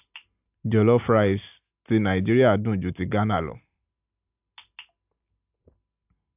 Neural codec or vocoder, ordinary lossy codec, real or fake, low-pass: none; AAC, 32 kbps; real; 3.6 kHz